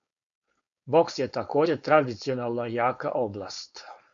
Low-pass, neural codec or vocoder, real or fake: 7.2 kHz; codec, 16 kHz, 4.8 kbps, FACodec; fake